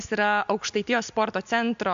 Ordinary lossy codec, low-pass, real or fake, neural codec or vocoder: MP3, 64 kbps; 7.2 kHz; real; none